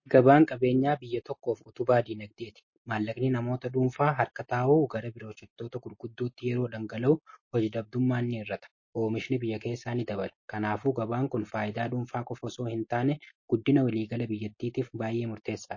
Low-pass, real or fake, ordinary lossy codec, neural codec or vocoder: 7.2 kHz; real; MP3, 32 kbps; none